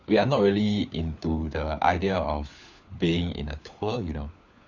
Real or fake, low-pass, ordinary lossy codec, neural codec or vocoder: fake; 7.2 kHz; none; codec, 16 kHz, 4 kbps, FunCodec, trained on LibriTTS, 50 frames a second